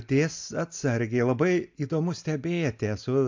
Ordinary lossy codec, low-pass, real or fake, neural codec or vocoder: MP3, 64 kbps; 7.2 kHz; real; none